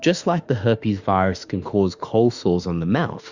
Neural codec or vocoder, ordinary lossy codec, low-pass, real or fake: autoencoder, 48 kHz, 32 numbers a frame, DAC-VAE, trained on Japanese speech; Opus, 64 kbps; 7.2 kHz; fake